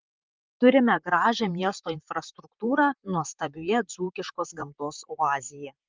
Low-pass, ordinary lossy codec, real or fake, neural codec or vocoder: 7.2 kHz; Opus, 24 kbps; fake; vocoder, 44.1 kHz, 128 mel bands, Pupu-Vocoder